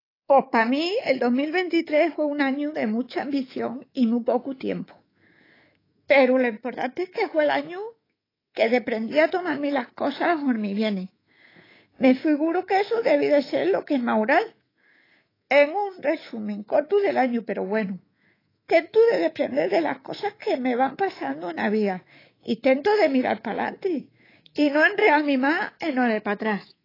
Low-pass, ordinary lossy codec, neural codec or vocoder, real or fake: 5.4 kHz; AAC, 24 kbps; codec, 24 kHz, 3.1 kbps, DualCodec; fake